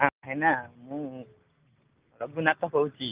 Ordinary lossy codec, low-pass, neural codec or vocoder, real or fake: Opus, 32 kbps; 3.6 kHz; none; real